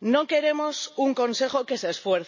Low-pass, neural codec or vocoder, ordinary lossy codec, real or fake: 7.2 kHz; none; MP3, 32 kbps; real